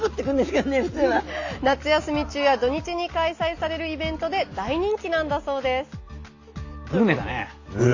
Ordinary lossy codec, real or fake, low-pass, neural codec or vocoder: AAC, 48 kbps; real; 7.2 kHz; none